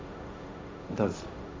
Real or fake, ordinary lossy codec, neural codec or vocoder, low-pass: fake; none; codec, 16 kHz, 1.1 kbps, Voila-Tokenizer; none